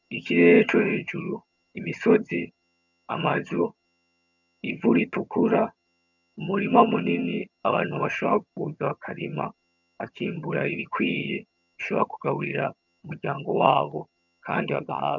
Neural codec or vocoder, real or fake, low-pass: vocoder, 22.05 kHz, 80 mel bands, HiFi-GAN; fake; 7.2 kHz